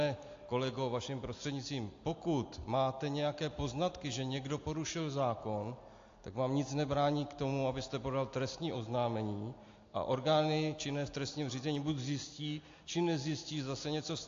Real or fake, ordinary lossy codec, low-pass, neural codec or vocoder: real; AAC, 48 kbps; 7.2 kHz; none